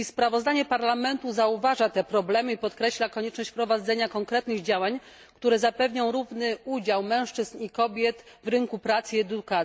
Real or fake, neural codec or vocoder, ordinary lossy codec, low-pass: real; none; none; none